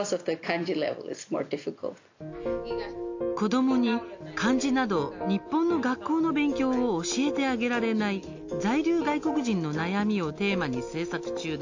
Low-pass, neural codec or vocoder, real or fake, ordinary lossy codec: 7.2 kHz; none; real; AAC, 48 kbps